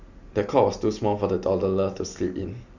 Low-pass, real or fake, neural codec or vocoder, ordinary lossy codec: 7.2 kHz; real; none; none